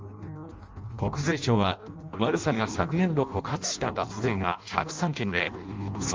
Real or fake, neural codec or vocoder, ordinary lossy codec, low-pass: fake; codec, 16 kHz in and 24 kHz out, 0.6 kbps, FireRedTTS-2 codec; Opus, 32 kbps; 7.2 kHz